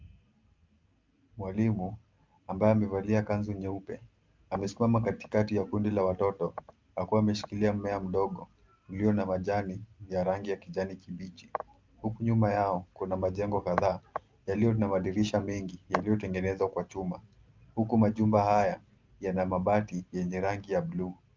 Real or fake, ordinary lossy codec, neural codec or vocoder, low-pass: real; Opus, 24 kbps; none; 7.2 kHz